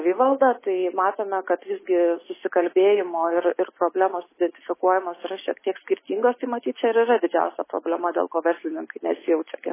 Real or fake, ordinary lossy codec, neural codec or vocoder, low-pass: real; MP3, 16 kbps; none; 3.6 kHz